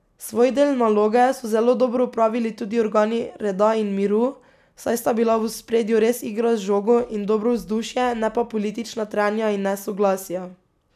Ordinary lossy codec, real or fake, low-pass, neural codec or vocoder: none; real; 14.4 kHz; none